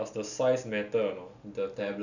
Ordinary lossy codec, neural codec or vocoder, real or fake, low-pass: none; none; real; 7.2 kHz